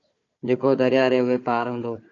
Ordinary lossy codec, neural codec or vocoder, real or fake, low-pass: AAC, 48 kbps; codec, 16 kHz, 4 kbps, FunCodec, trained on Chinese and English, 50 frames a second; fake; 7.2 kHz